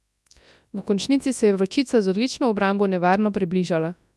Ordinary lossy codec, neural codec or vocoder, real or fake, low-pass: none; codec, 24 kHz, 0.9 kbps, WavTokenizer, large speech release; fake; none